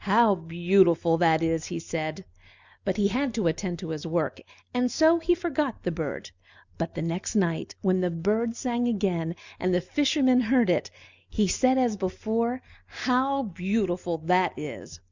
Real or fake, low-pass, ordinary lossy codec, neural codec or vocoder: real; 7.2 kHz; Opus, 64 kbps; none